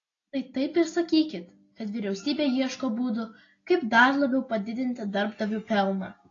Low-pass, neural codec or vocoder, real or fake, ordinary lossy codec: 7.2 kHz; none; real; AAC, 32 kbps